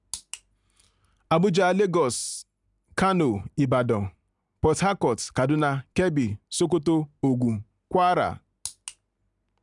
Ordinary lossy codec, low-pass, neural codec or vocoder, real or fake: none; 10.8 kHz; none; real